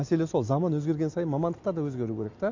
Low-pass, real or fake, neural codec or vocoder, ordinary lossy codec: 7.2 kHz; real; none; MP3, 48 kbps